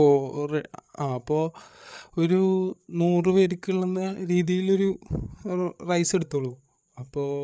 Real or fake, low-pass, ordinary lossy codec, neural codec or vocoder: fake; none; none; codec, 16 kHz, 8 kbps, FreqCodec, larger model